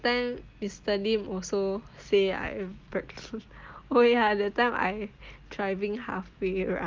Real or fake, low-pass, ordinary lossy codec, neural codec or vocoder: real; 7.2 kHz; Opus, 24 kbps; none